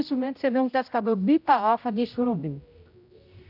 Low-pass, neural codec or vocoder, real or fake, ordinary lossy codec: 5.4 kHz; codec, 16 kHz, 0.5 kbps, X-Codec, HuBERT features, trained on general audio; fake; none